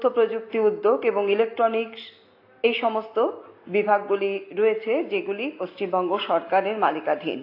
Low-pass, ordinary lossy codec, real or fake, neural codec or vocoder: 5.4 kHz; AAC, 32 kbps; real; none